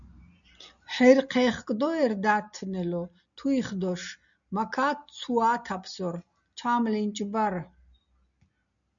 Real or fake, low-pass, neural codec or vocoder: real; 7.2 kHz; none